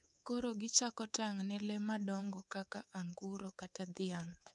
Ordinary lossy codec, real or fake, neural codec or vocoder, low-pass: none; fake; codec, 24 kHz, 3.1 kbps, DualCodec; 10.8 kHz